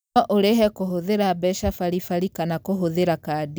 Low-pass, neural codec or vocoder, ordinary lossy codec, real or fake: none; none; none; real